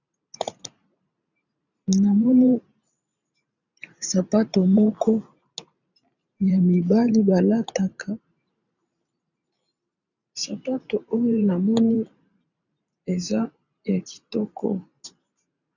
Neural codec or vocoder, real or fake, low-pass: vocoder, 44.1 kHz, 128 mel bands every 512 samples, BigVGAN v2; fake; 7.2 kHz